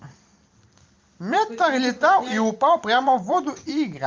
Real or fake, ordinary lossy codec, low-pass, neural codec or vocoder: fake; Opus, 32 kbps; 7.2 kHz; vocoder, 44.1 kHz, 128 mel bands every 512 samples, BigVGAN v2